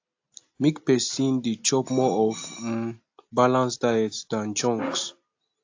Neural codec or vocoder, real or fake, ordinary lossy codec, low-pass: none; real; AAC, 48 kbps; 7.2 kHz